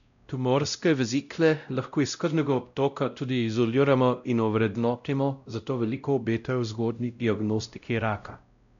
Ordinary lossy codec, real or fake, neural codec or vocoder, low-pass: none; fake; codec, 16 kHz, 0.5 kbps, X-Codec, WavLM features, trained on Multilingual LibriSpeech; 7.2 kHz